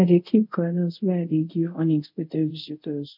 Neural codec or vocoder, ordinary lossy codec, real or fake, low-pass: codec, 24 kHz, 0.5 kbps, DualCodec; none; fake; 5.4 kHz